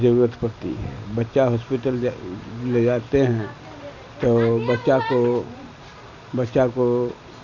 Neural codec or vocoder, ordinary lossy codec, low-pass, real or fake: none; none; 7.2 kHz; real